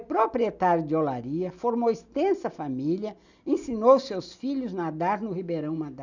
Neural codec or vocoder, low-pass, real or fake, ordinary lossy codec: none; 7.2 kHz; real; none